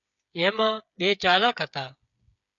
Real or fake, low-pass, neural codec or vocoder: fake; 7.2 kHz; codec, 16 kHz, 8 kbps, FreqCodec, smaller model